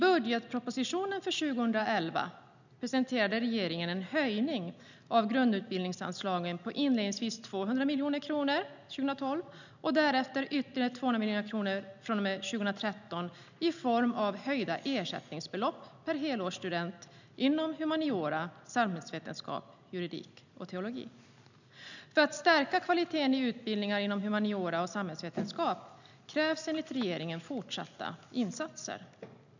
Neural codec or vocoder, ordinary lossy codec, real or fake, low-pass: none; none; real; 7.2 kHz